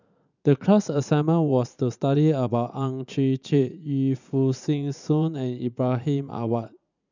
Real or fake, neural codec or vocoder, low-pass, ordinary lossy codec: fake; vocoder, 44.1 kHz, 80 mel bands, Vocos; 7.2 kHz; none